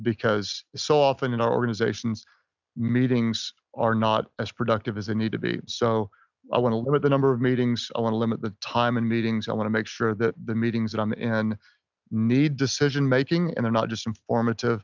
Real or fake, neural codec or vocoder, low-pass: real; none; 7.2 kHz